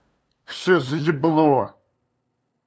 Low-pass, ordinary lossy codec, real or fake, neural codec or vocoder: none; none; fake; codec, 16 kHz, 2 kbps, FunCodec, trained on LibriTTS, 25 frames a second